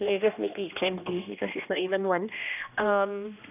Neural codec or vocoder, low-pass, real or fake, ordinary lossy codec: codec, 16 kHz, 1 kbps, X-Codec, HuBERT features, trained on general audio; 3.6 kHz; fake; none